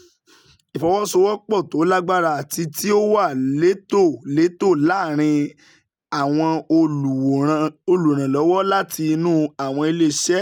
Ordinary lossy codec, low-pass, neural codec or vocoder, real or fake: none; 19.8 kHz; none; real